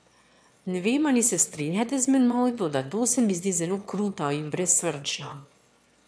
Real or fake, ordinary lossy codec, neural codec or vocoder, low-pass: fake; none; autoencoder, 22.05 kHz, a latent of 192 numbers a frame, VITS, trained on one speaker; none